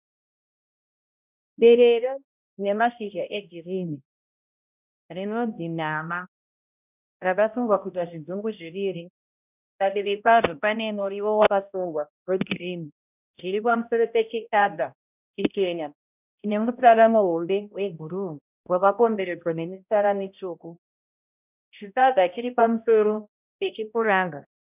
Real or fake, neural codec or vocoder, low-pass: fake; codec, 16 kHz, 0.5 kbps, X-Codec, HuBERT features, trained on balanced general audio; 3.6 kHz